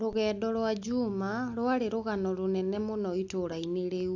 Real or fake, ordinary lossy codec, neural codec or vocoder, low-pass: real; AAC, 48 kbps; none; 7.2 kHz